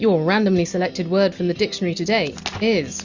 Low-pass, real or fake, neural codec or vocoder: 7.2 kHz; real; none